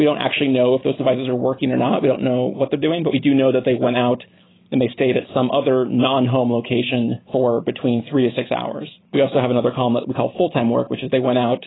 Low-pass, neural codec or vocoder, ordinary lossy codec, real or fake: 7.2 kHz; none; AAC, 16 kbps; real